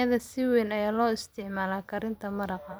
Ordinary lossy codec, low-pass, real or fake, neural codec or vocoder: none; none; fake; vocoder, 44.1 kHz, 128 mel bands every 256 samples, BigVGAN v2